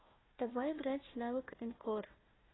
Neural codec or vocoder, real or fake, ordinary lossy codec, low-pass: codec, 16 kHz, 1 kbps, FunCodec, trained on Chinese and English, 50 frames a second; fake; AAC, 16 kbps; 7.2 kHz